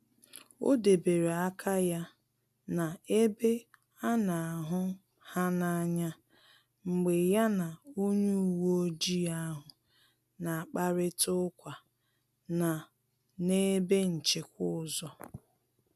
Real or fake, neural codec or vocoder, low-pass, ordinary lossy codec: real; none; 14.4 kHz; none